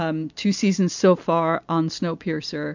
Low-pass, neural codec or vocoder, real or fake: 7.2 kHz; none; real